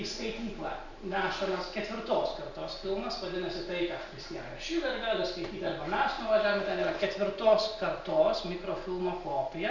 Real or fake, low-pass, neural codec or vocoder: real; 7.2 kHz; none